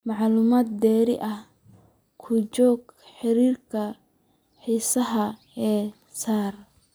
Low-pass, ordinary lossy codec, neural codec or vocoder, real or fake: none; none; none; real